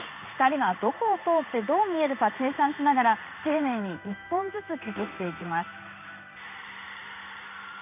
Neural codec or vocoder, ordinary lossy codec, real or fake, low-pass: codec, 16 kHz in and 24 kHz out, 1 kbps, XY-Tokenizer; none; fake; 3.6 kHz